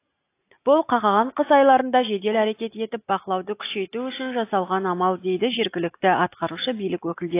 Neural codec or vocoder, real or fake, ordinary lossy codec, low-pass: none; real; AAC, 24 kbps; 3.6 kHz